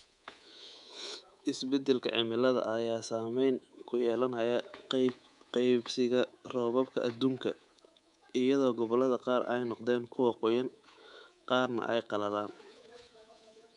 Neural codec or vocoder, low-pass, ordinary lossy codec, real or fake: codec, 24 kHz, 3.1 kbps, DualCodec; 10.8 kHz; none; fake